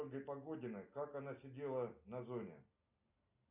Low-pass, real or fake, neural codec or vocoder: 3.6 kHz; real; none